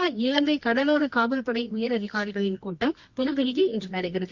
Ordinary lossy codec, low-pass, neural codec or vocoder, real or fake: Opus, 64 kbps; 7.2 kHz; codec, 24 kHz, 0.9 kbps, WavTokenizer, medium music audio release; fake